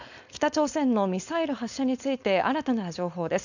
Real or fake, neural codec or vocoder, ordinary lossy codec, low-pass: fake; codec, 16 kHz, 4.8 kbps, FACodec; none; 7.2 kHz